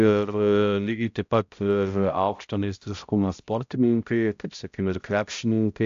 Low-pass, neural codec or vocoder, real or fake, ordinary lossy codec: 7.2 kHz; codec, 16 kHz, 0.5 kbps, X-Codec, HuBERT features, trained on balanced general audio; fake; AAC, 64 kbps